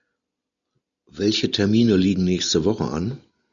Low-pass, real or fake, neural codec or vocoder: 7.2 kHz; real; none